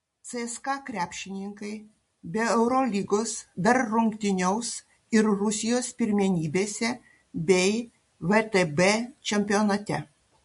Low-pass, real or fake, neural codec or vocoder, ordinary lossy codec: 14.4 kHz; real; none; MP3, 48 kbps